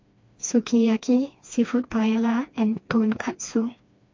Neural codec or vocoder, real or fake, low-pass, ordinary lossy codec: codec, 16 kHz, 2 kbps, FreqCodec, smaller model; fake; 7.2 kHz; MP3, 48 kbps